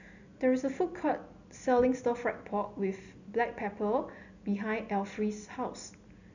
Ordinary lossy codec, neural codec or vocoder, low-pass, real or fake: none; none; 7.2 kHz; real